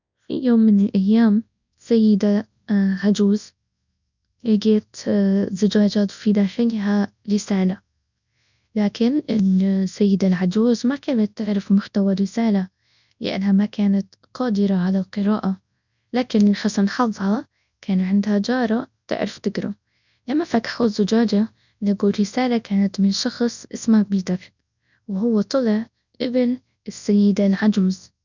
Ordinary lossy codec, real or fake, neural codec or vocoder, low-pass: none; fake; codec, 24 kHz, 0.9 kbps, WavTokenizer, large speech release; 7.2 kHz